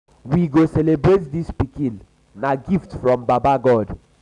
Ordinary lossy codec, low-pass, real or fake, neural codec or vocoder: none; 10.8 kHz; real; none